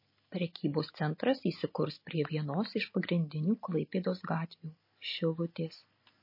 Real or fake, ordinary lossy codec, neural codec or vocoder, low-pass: real; MP3, 24 kbps; none; 5.4 kHz